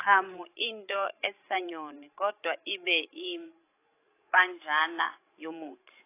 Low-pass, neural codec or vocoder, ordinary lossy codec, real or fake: 3.6 kHz; codec, 16 kHz, 16 kbps, FreqCodec, larger model; AAC, 24 kbps; fake